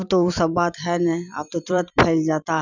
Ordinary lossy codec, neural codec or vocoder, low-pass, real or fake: none; vocoder, 44.1 kHz, 128 mel bands every 256 samples, BigVGAN v2; 7.2 kHz; fake